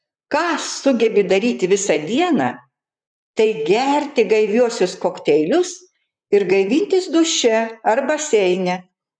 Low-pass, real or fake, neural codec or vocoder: 9.9 kHz; fake; vocoder, 44.1 kHz, 128 mel bands, Pupu-Vocoder